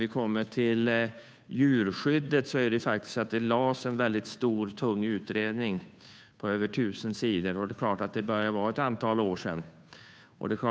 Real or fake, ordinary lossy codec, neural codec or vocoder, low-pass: fake; none; codec, 16 kHz, 2 kbps, FunCodec, trained on Chinese and English, 25 frames a second; none